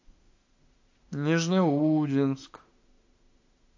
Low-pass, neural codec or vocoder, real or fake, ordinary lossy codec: 7.2 kHz; autoencoder, 48 kHz, 32 numbers a frame, DAC-VAE, trained on Japanese speech; fake; AAC, 48 kbps